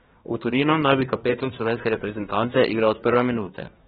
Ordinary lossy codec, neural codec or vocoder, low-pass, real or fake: AAC, 16 kbps; codec, 24 kHz, 1 kbps, SNAC; 10.8 kHz; fake